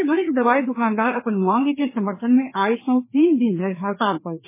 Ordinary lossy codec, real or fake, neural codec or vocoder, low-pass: MP3, 16 kbps; fake; codec, 16 kHz, 2 kbps, FreqCodec, larger model; 3.6 kHz